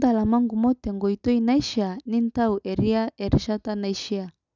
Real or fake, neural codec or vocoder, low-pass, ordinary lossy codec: real; none; 7.2 kHz; none